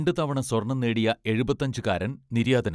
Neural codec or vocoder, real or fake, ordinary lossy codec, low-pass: none; real; none; none